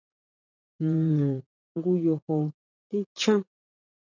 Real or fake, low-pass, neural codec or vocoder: fake; 7.2 kHz; vocoder, 44.1 kHz, 80 mel bands, Vocos